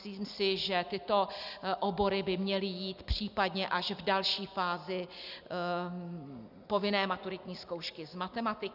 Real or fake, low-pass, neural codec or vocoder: real; 5.4 kHz; none